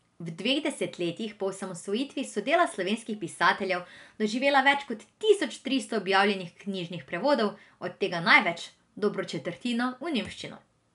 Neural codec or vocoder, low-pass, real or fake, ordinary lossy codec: none; 10.8 kHz; real; none